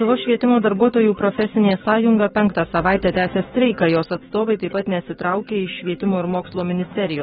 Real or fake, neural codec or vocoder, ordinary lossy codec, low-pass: fake; codec, 16 kHz, 6 kbps, DAC; AAC, 16 kbps; 7.2 kHz